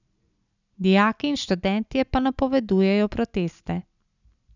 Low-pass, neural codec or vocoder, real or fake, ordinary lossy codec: 7.2 kHz; none; real; none